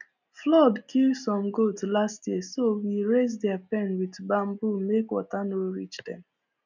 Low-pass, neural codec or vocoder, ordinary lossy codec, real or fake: 7.2 kHz; none; none; real